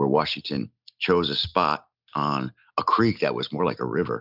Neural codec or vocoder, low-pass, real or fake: none; 5.4 kHz; real